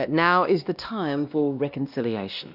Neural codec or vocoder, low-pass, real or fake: codec, 16 kHz, 1 kbps, X-Codec, WavLM features, trained on Multilingual LibriSpeech; 5.4 kHz; fake